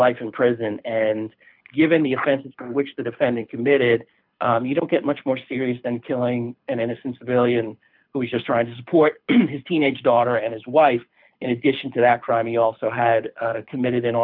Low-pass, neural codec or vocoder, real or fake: 5.4 kHz; codec, 24 kHz, 6 kbps, HILCodec; fake